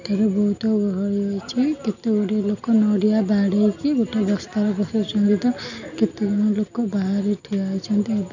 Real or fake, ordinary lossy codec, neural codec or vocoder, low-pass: real; none; none; 7.2 kHz